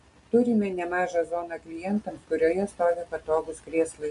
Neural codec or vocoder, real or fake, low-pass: none; real; 10.8 kHz